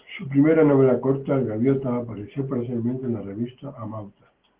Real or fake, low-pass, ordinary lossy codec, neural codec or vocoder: real; 3.6 kHz; Opus, 16 kbps; none